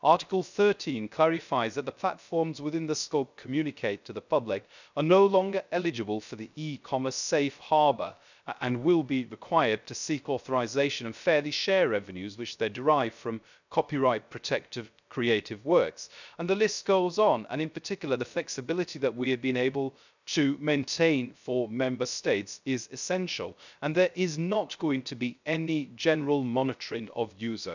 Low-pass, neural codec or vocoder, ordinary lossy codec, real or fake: 7.2 kHz; codec, 16 kHz, 0.3 kbps, FocalCodec; none; fake